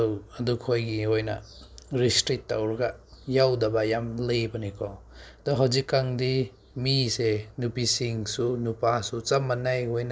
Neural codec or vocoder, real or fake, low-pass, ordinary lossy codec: none; real; none; none